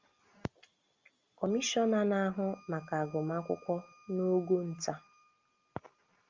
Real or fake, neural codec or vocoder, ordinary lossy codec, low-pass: real; none; Opus, 32 kbps; 7.2 kHz